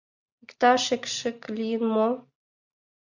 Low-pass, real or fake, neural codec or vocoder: 7.2 kHz; real; none